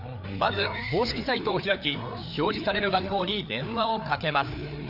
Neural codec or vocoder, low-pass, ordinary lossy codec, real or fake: codec, 16 kHz, 4 kbps, FreqCodec, larger model; 5.4 kHz; none; fake